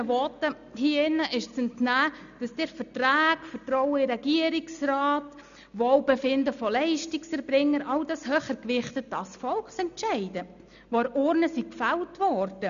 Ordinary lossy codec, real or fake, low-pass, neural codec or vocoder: none; real; 7.2 kHz; none